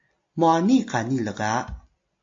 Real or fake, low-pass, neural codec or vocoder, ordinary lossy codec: real; 7.2 kHz; none; AAC, 48 kbps